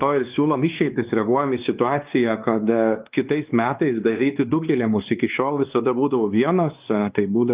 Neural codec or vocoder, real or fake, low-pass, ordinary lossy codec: codec, 16 kHz, 2 kbps, X-Codec, WavLM features, trained on Multilingual LibriSpeech; fake; 3.6 kHz; Opus, 24 kbps